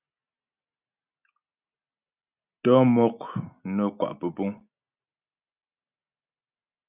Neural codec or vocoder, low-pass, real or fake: none; 3.6 kHz; real